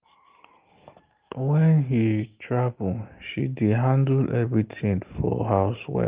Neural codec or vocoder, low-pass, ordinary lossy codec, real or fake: none; 3.6 kHz; Opus, 32 kbps; real